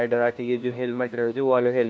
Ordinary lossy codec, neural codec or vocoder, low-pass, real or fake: none; codec, 16 kHz, 1 kbps, FunCodec, trained on LibriTTS, 50 frames a second; none; fake